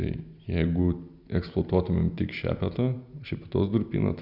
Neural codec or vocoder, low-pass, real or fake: none; 5.4 kHz; real